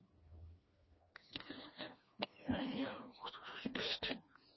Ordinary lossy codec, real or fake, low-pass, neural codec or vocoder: MP3, 24 kbps; fake; 7.2 kHz; codec, 16 kHz, 2 kbps, FreqCodec, smaller model